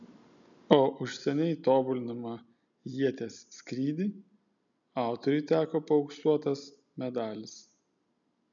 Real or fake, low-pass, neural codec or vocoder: real; 7.2 kHz; none